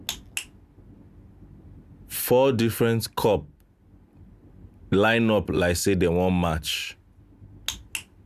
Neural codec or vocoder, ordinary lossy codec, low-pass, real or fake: none; Opus, 64 kbps; 14.4 kHz; real